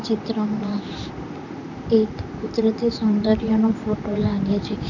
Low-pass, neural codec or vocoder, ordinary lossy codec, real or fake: 7.2 kHz; vocoder, 44.1 kHz, 128 mel bands, Pupu-Vocoder; none; fake